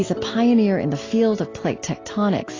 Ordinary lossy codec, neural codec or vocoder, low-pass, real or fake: AAC, 32 kbps; none; 7.2 kHz; real